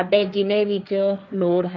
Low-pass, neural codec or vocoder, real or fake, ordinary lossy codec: none; codec, 16 kHz, 1.1 kbps, Voila-Tokenizer; fake; none